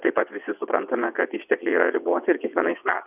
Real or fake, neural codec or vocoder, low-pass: fake; vocoder, 22.05 kHz, 80 mel bands, WaveNeXt; 3.6 kHz